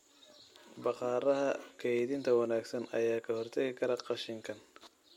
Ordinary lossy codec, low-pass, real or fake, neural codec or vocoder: MP3, 64 kbps; 19.8 kHz; real; none